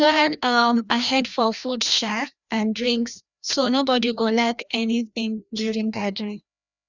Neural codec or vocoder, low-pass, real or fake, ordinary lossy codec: codec, 16 kHz, 1 kbps, FreqCodec, larger model; 7.2 kHz; fake; none